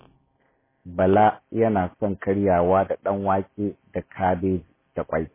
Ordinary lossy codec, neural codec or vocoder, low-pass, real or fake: MP3, 16 kbps; none; 3.6 kHz; real